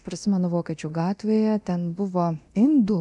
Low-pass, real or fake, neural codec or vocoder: 10.8 kHz; fake; codec, 24 kHz, 0.9 kbps, DualCodec